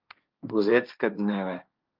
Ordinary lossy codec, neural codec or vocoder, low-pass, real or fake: Opus, 24 kbps; codec, 16 kHz, 1.1 kbps, Voila-Tokenizer; 5.4 kHz; fake